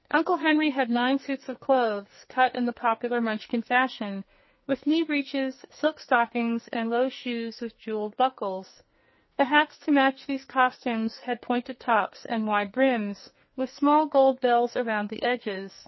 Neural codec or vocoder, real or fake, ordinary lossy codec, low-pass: codec, 44.1 kHz, 2.6 kbps, SNAC; fake; MP3, 24 kbps; 7.2 kHz